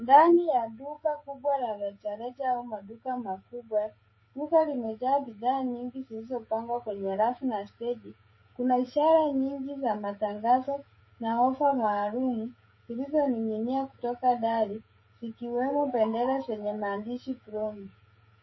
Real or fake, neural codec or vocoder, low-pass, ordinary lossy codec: fake; codec, 16 kHz, 16 kbps, FreqCodec, smaller model; 7.2 kHz; MP3, 24 kbps